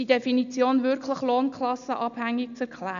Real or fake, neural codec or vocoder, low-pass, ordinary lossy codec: real; none; 7.2 kHz; none